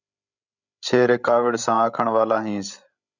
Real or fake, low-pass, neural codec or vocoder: fake; 7.2 kHz; codec, 16 kHz, 16 kbps, FreqCodec, larger model